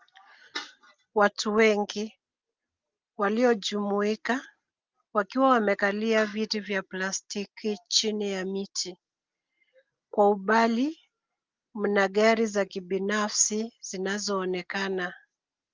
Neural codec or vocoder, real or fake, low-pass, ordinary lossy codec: none; real; 7.2 kHz; Opus, 32 kbps